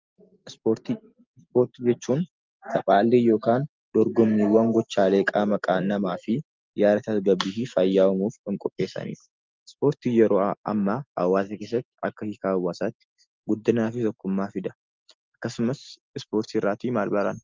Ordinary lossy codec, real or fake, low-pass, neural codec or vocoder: Opus, 24 kbps; real; 7.2 kHz; none